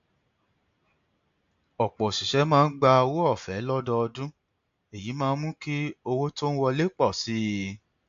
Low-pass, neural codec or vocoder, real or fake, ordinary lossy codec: 7.2 kHz; none; real; AAC, 64 kbps